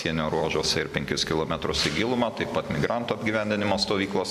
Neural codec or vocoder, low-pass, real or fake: none; 14.4 kHz; real